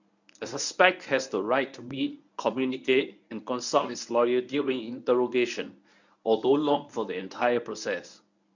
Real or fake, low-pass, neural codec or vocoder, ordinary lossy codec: fake; 7.2 kHz; codec, 24 kHz, 0.9 kbps, WavTokenizer, medium speech release version 1; none